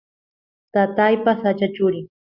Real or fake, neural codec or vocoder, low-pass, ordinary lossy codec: real; none; 5.4 kHz; Opus, 64 kbps